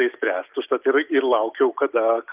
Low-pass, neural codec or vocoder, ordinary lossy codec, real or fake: 3.6 kHz; none; Opus, 32 kbps; real